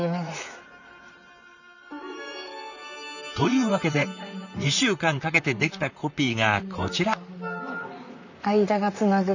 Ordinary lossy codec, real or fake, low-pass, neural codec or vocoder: none; fake; 7.2 kHz; vocoder, 44.1 kHz, 128 mel bands, Pupu-Vocoder